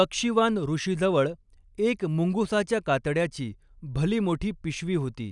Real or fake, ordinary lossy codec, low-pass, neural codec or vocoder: real; none; 10.8 kHz; none